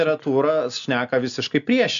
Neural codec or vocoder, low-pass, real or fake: none; 7.2 kHz; real